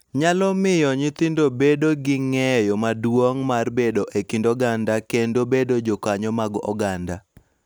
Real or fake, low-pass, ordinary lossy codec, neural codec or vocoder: real; none; none; none